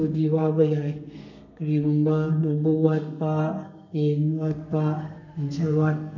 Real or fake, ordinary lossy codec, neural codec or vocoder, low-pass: fake; none; codec, 32 kHz, 1.9 kbps, SNAC; 7.2 kHz